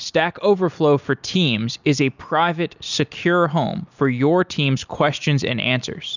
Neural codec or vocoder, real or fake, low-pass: none; real; 7.2 kHz